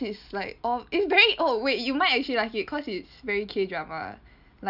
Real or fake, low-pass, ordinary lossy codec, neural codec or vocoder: real; 5.4 kHz; none; none